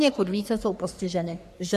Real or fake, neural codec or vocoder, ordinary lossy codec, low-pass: fake; codec, 44.1 kHz, 3.4 kbps, Pupu-Codec; AAC, 96 kbps; 14.4 kHz